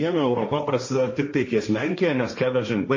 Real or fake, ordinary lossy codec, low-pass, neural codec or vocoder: fake; MP3, 32 kbps; 7.2 kHz; codec, 16 kHz, 1.1 kbps, Voila-Tokenizer